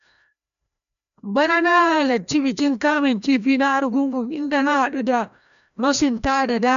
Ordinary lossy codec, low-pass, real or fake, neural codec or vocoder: none; 7.2 kHz; fake; codec, 16 kHz, 1 kbps, FreqCodec, larger model